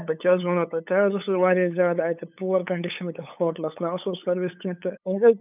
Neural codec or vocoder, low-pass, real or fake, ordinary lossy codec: codec, 16 kHz, 8 kbps, FunCodec, trained on LibriTTS, 25 frames a second; 3.6 kHz; fake; none